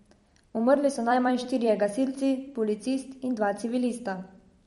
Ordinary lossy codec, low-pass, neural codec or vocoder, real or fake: MP3, 48 kbps; 19.8 kHz; vocoder, 44.1 kHz, 128 mel bands every 256 samples, BigVGAN v2; fake